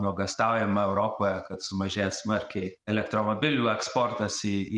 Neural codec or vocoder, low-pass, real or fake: none; 10.8 kHz; real